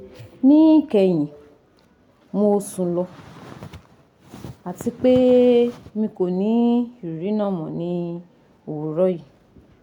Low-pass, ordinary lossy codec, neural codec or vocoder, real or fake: 19.8 kHz; none; none; real